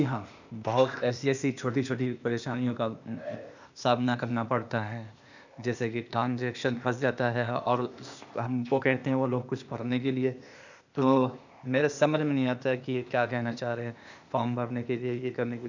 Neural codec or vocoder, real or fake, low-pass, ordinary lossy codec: codec, 16 kHz, 0.8 kbps, ZipCodec; fake; 7.2 kHz; none